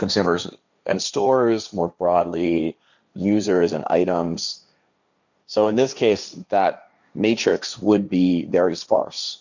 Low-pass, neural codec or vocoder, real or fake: 7.2 kHz; codec, 16 kHz, 1.1 kbps, Voila-Tokenizer; fake